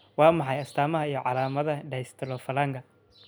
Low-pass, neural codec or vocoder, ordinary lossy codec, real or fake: none; none; none; real